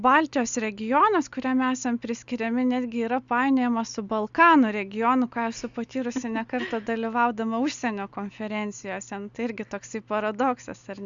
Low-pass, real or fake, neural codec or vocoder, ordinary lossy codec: 7.2 kHz; real; none; Opus, 64 kbps